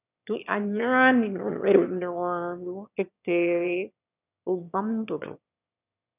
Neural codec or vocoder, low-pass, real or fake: autoencoder, 22.05 kHz, a latent of 192 numbers a frame, VITS, trained on one speaker; 3.6 kHz; fake